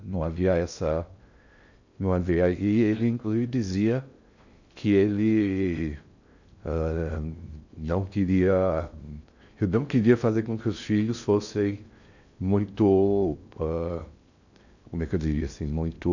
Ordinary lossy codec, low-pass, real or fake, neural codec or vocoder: none; 7.2 kHz; fake; codec, 16 kHz in and 24 kHz out, 0.6 kbps, FocalCodec, streaming, 2048 codes